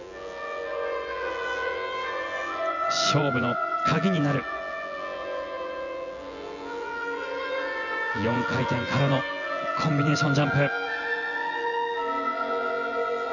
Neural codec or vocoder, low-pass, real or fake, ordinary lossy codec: vocoder, 24 kHz, 100 mel bands, Vocos; 7.2 kHz; fake; none